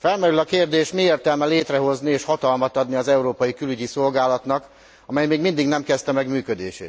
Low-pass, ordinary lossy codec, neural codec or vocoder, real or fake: none; none; none; real